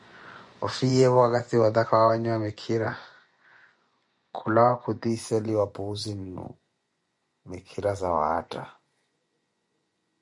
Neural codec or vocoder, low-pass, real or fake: vocoder, 24 kHz, 100 mel bands, Vocos; 10.8 kHz; fake